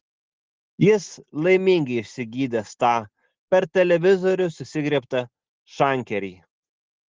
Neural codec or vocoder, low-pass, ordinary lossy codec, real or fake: none; 7.2 kHz; Opus, 16 kbps; real